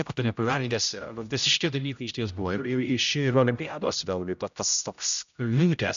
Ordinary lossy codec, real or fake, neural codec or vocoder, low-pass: AAC, 96 kbps; fake; codec, 16 kHz, 0.5 kbps, X-Codec, HuBERT features, trained on general audio; 7.2 kHz